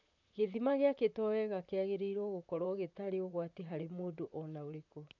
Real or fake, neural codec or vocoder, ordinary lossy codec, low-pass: fake; vocoder, 44.1 kHz, 128 mel bands, Pupu-Vocoder; none; 7.2 kHz